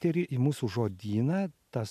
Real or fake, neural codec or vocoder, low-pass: real; none; 14.4 kHz